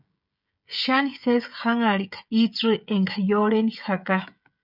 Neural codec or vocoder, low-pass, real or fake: codec, 16 kHz, 16 kbps, FreqCodec, smaller model; 5.4 kHz; fake